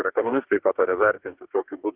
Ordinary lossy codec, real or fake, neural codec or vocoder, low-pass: Opus, 32 kbps; fake; codec, 44.1 kHz, 2.6 kbps, DAC; 3.6 kHz